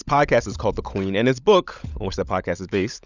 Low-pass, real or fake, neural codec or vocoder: 7.2 kHz; fake; codec, 16 kHz, 16 kbps, FunCodec, trained on LibriTTS, 50 frames a second